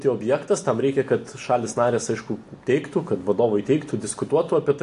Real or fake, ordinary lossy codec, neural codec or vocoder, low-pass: real; AAC, 48 kbps; none; 10.8 kHz